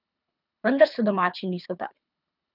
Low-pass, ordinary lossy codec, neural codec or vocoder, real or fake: 5.4 kHz; none; codec, 24 kHz, 3 kbps, HILCodec; fake